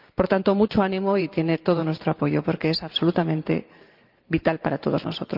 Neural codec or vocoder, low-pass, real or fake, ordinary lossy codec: vocoder, 44.1 kHz, 128 mel bands every 512 samples, BigVGAN v2; 5.4 kHz; fake; Opus, 32 kbps